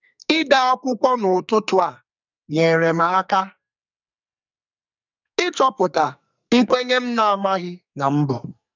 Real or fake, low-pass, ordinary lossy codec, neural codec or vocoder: fake; 7.2 kHz; none; codec, 44.1 kHz, 2.6 kbps, SNAC